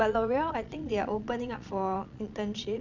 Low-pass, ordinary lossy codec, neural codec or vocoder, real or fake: 7.2 kHz; none; vocoder, 22.05 kHz, 80 mel bands, Vocos; fake